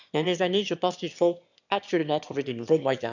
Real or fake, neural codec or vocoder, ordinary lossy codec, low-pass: fake; autoencoder, 22.05 kHz, a latent of 192 numbers a frame, VITS, trained on one speaker; none; 7.2 kHz